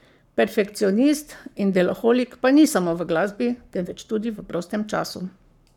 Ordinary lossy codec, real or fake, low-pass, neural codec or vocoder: none; fake; 19.8 kHz; codec, 44.1 kHz, 7.8 kbps, Pupu-Codec